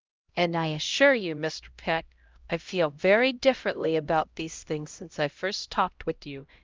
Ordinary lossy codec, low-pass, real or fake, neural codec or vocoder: Opus, 24 kbps; 7.2 kHz; fake; codec, 16 kHz, 0.5 kbps, X-Codec, HuBERT features, trained on LibriSpeech